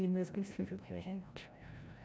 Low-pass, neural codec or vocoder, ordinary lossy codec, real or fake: none; codec, 16 kHz, 0.5 kbps, FreqCodec, larger model; none; fake